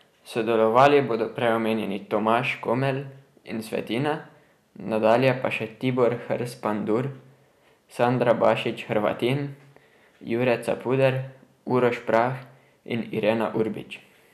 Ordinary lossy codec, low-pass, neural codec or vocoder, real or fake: none; 14.4 kHz; none; real